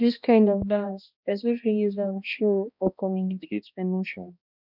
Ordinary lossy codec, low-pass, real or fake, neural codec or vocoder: none; 5.4 kHz; fake; codec, 16 kHz, 1 kbps, X-Codec, HuBERT features, trained on balanced general audio